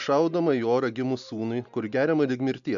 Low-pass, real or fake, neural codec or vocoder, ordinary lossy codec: 7.2 kHz; real; none; MP3, 96 kbps